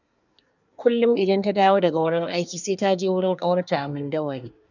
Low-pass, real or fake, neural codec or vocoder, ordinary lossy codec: 7.2 kHz; fake; codec, 24 kHz, 1 kbps, SNAC; none